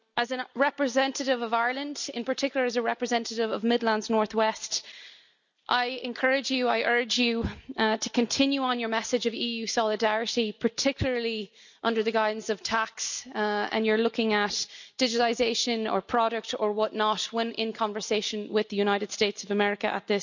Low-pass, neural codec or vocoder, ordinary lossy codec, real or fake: 7.2 kHz; none; none; real